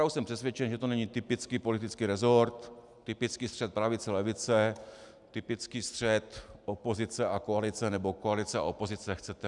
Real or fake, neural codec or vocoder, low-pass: real; none; 10.8 kHz